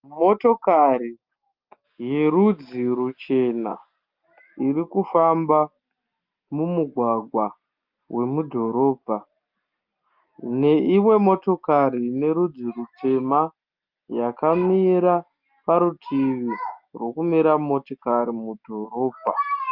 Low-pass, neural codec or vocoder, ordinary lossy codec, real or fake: 5.4 kHz; none; Opus, 24 kbps; real